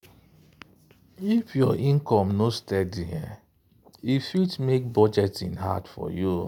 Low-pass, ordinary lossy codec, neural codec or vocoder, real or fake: 19.8 kHz; none; none; real